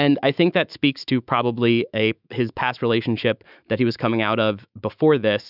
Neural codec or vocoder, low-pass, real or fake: autoencoder, 48 kHz, 128 numbers a frame, DAC-VAE, trained on Japanese speech; 5.4 kHz; fake